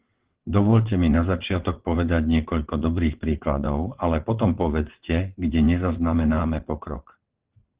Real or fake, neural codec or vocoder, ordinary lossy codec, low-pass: fake; vocoder, 22.05 kHz, 80 mel bands, WaveNeXt; Opus, 16 kbps; 3.6 kHz